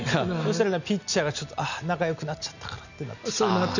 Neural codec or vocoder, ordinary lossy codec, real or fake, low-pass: none; none; real; 7.2 kHz